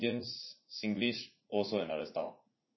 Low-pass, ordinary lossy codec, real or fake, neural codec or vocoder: 7.2 kHz; MP3, 24 kbps; fake; vocoder, 44.1 kHz, 80 mel bands, Vocos